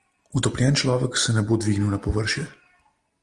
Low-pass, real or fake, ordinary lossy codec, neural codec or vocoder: 10.8 kHz; real; Opus, 24 kbps; none